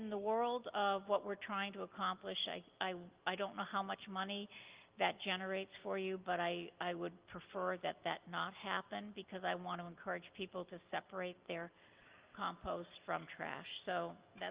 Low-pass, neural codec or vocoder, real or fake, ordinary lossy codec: 3.6 kHz; none; real; Opus, 32 kbps